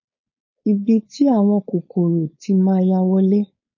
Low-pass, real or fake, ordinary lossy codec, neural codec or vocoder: 7.2 kHz; fake; MP3, 32 kbps; codec, 16 kHz, 4.8 kbps, FACodec